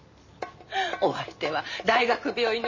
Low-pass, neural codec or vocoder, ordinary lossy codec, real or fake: 7.2 kHz; none; MP3, 32 kbps; real